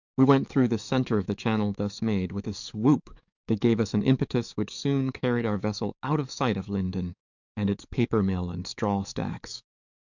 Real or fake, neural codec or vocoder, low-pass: fake; codec, 44.1 kHz, 7.8 kbps, DAC; 7.2 kHz